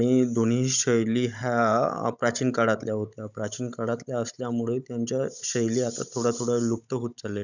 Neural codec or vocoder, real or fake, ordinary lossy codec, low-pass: codec, 16 kHz, 16 kbps, FunCodec, trained on Chinese and English, 50 frames a second; fake; none; 7.2 kHz